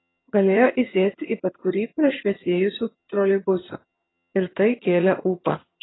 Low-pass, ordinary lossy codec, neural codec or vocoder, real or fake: 7.2 kHz; AAC, 16 kbps; vocoder, 22.05 kHz, 80 mel bands, HiFi-GAN; fake